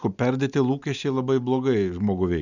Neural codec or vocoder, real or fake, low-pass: none; real; 7.2 kHz